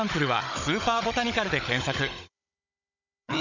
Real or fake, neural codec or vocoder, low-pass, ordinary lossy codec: fake; codec, 16 kHz, 16 kbps, FunCodec, trained on Chinese and English, 50 frames a second; 7.2 kHz; none